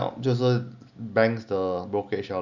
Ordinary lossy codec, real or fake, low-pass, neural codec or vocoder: none; real; 7.2 kHz; none